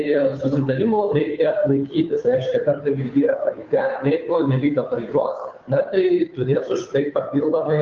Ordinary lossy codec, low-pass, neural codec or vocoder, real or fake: Opus, 24 kbps; 7.2 kHz; codec, 16 kHz, 2 kbps, FunCodec, trained on Chinese and English, 25 frames a second; fake